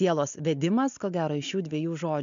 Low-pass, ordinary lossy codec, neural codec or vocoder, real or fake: 7.2 kHz; MP3, 64 kbps; none; real